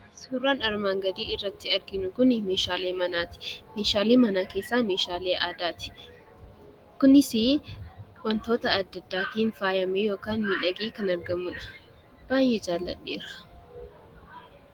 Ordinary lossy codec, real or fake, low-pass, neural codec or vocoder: Opus, 24 kbps; fake; 19.8 kHz; autoencoder, 48 kHz, 128 numbers a frame, DAC-VAE, trained on Japanese speech